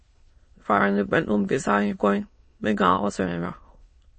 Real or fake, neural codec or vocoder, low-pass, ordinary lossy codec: fake; autoencoder, 22.05 kHz, a latent of 192 numbers a frame, VITS, trained on many speakers; 9.9 kHz; MP3, 32 kbps